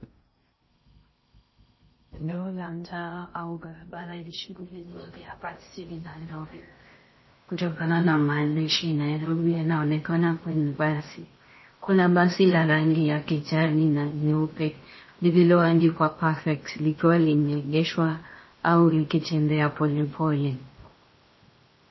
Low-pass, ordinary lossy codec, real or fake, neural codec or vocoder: 7.2 kHz; MP3, 24 kbps; fake; codec, 16 kHz in and 24 kHz out, 0.6 kbps, FocalCodec, streaming, 4096 codes